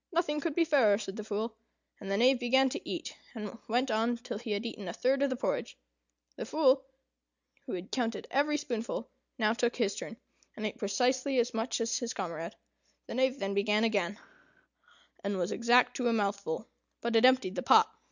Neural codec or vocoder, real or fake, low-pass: none; real; 7.2 kHz